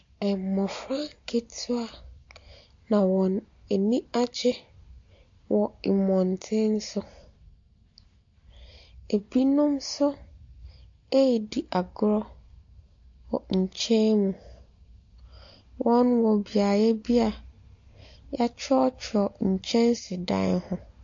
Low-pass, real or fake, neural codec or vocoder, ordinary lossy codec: 7.2 kHz; real; none; AAC, 48 kbps